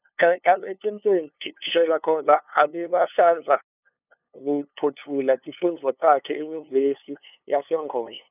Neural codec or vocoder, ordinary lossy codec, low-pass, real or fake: codec, 16 kHz, 2 kbps, FunCodec, trained on LibriTTS, 25 frames a second; none; 3.6 kHz; fake